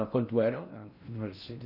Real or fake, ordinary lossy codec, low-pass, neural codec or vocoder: fake; none; 5.4 kHz; codec, 16 kHz in and 24 kHz out, 0.6 kbps, FocalCodec, streaming, 4096 codes